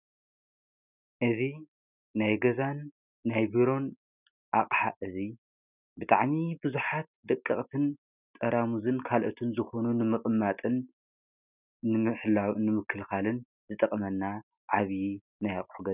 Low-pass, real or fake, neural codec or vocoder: 3.6 kHz; real; none